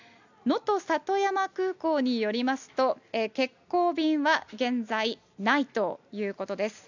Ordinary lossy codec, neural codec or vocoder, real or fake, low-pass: none; none; real; 7.2 kHz